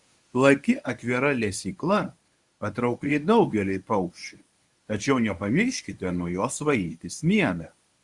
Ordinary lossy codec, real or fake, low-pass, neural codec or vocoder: Opus, 64 kbps; fake; 10.8 kHz; codec, 24 kHz, 0.9 kbps, WavTokenizer, medium speech release version 1